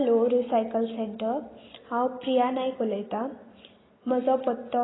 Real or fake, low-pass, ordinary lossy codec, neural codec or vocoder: real; 7.2 kHz; AAC, 16 kbps; none